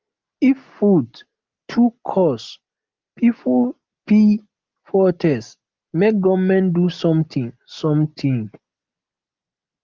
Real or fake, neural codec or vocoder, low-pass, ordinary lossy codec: real; none; 7.2 kHz; Opus, 32 kbps